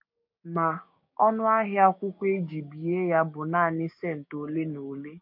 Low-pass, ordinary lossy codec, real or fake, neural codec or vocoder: 5.4 kHz; MP3, 32 kbps; fake; codec, 16 kHz, 6 kbps, DAC